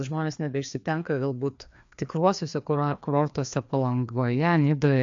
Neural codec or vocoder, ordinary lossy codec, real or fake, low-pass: codec, 16 kHz, 2 kbps, FreqCodec, larger model; AAC, 64 kbps; fake; 7.2 kHz